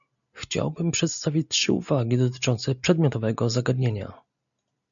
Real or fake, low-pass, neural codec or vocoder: real; 7.2 kHz; none